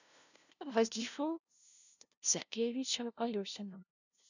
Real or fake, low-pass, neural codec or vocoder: fake; 7.2 kHz; codec, 16 kHz, 0.5 kbps, FunCodec, trained on LibriTTS, 25 frames a second